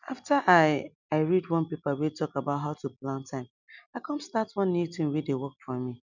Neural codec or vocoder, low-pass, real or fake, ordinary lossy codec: none; 7.2 kHz; real; none